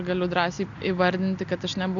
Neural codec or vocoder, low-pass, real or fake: none; 7.2 kHz; real